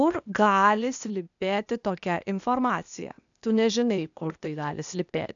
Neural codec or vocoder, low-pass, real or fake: codec, 16 kHz, 0.8 kbps, ZipCodec; 7.2 kHz; fake